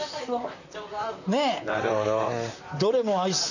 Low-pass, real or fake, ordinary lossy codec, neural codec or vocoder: 7.2 kHz; fake; none; vocoder, 44.1 kHz, 128 mel bands, Pupu-Vocoder